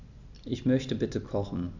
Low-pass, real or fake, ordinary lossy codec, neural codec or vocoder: 7.2 kHz; real; none; none